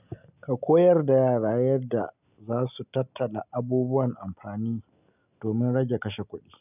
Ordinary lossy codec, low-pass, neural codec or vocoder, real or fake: none; 3.6 kHz; none; real